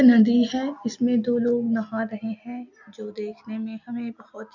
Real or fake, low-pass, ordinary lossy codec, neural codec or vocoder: real; 7.2 kHz; none; none